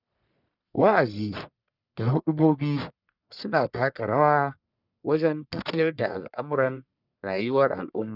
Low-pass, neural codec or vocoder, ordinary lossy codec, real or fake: 5.4 kHz; codec, 44.1 kHz, 1.7 kbps, Pupu-Codec; none; fake